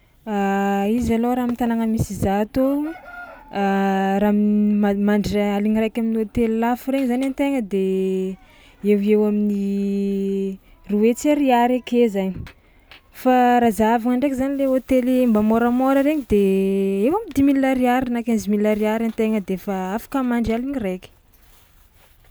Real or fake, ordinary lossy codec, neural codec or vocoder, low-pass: real; none; none; none